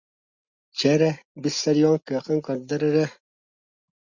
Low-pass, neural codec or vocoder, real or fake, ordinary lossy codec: 7.2 kHz; none; real; Opus, 64 kbps